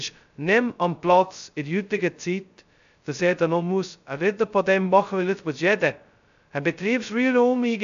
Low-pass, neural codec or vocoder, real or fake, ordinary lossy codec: 7.2 kHz; codec, 16 kHz, 0.2 kbps, FocalCodec; fake; AAC, 96 kbps